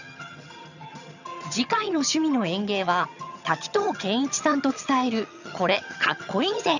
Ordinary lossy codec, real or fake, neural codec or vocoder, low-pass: none; fake; vocoder, 22.05 kHz, 80 mel bands, HiFi-GAN; 7.2 kHz